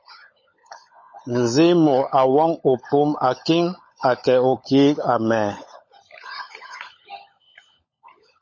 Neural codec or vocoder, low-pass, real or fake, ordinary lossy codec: codec, 16 kHz, 16 kbps, FunCodec, trained on LibriTTS, 50 frames a second; 7.2 kHz; fake; MP3, 32 kbps